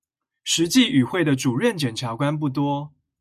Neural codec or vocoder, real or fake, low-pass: none; real; 14.4 kHz